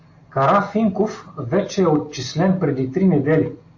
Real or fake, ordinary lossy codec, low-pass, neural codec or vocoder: real; AAC, 48 kbps; 7.2 kHz; none